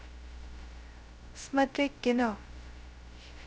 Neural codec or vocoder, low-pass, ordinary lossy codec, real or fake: codec, 16 kHz, 0.2 kbps, FocalCodec; none; none; fake